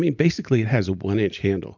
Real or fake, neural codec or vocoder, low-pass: real; none; 7.2 kHz